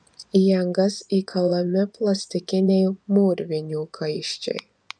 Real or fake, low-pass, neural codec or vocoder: fake; 9.9 kHz; vocoder, 24 kHz, 100 mel bands, Vocos